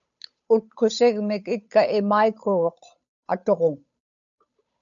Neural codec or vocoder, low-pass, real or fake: codec, 16 kHz, 8 kbps, FunCodec, trained on Chinese and English, 25 frames a second; 7.2 kHz; fake